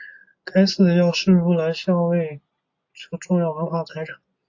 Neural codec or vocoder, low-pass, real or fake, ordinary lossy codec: none; 7.2 kHz; real; AAC, 48 kbps